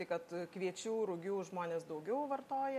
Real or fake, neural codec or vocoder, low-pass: real; none; 14.4 kHz